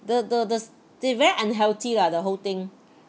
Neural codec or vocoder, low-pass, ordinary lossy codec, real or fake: none; none; none; real